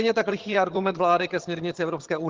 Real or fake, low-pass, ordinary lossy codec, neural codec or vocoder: fake; 7.2 kHz; Opus, 16 kbps; vocoder, 22.05 kHz, 80 mel bands, HiFi-GAN